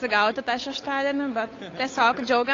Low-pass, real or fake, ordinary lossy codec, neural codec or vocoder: 7.2 kHz; fake; AAC, 32 kbps; codec, 16 kHz, 8 kbps, FunCodec, trained on Chinese and English, 25 frames a second